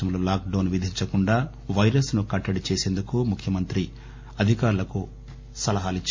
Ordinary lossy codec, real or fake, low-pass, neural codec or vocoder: MP3, 48 kbps; real; 7.2 kHz; none